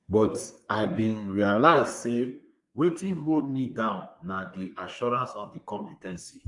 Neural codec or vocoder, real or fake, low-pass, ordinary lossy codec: codec, 24 kHz, 1 kbps, SNAC; fake; 10.8 kHz; AAC, 64 kbps